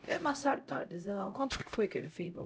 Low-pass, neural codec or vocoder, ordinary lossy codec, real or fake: none; codec, 16 kHz, 0.5 kbps, X-Codec, HuBERT features, trained on LibriSpeech; none; fake